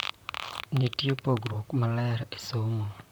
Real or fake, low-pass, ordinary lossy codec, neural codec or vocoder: fake; none; none; vocoder, 44.1 kHz, 128 mel bands, Pupu-Vocoder